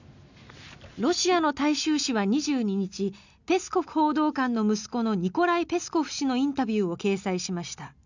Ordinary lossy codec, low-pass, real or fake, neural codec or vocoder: none; 7.2 kHz; real; none